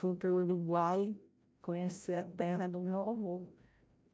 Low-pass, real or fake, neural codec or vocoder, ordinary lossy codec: none; fake; codec, 16 kHz, 0.5 kbps, FreqCodec, larger model; none